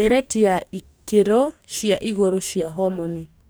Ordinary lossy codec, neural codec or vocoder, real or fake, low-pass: none; codec, 44.1 kHz, 2.6 kbps, DAC; fake; none